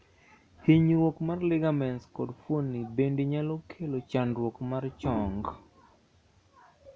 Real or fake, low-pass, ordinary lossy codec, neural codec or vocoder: real; none; none; none